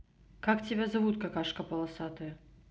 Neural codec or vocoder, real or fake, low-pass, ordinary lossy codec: none; real; none; none